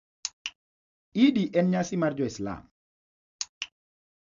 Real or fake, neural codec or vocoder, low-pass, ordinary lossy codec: real; none; 7.2 kHz; MP3, 64 kbps